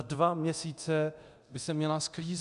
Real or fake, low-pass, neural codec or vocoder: fake; 10.8 kHz; codec, 24 kHz, 0.9 kbps, DualCodec